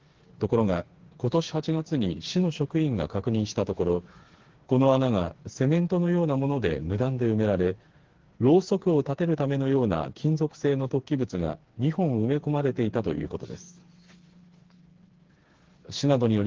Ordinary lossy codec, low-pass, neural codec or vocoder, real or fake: Opus, 16 kbps; 7.2 kHz; codec, 16 kHz, 4 kbps, FreqCodec, smaller model; fake